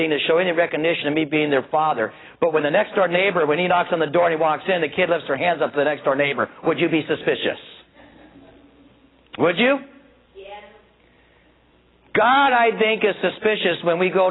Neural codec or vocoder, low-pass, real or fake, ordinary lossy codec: none; 7.2 kHz; real; AAC, 16 kbps